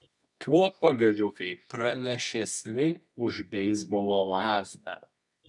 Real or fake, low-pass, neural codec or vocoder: fake; 10.8 kHz; codec, 24 kHz, 0.9 kbps, WavTokenizer, medium music audio release